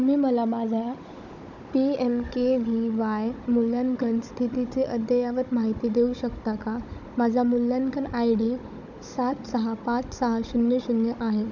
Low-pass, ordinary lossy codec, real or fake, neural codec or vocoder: 7.2 kHz; none; fake; codec, 16 kHz, 16 kbps, FunCodec, trained on Chinese and English, 50 frames a second